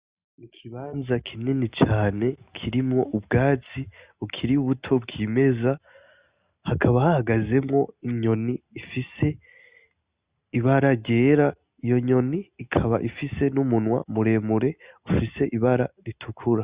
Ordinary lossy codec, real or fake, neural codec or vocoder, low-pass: Opus, 64 kbps; real; none; 3.6 kHz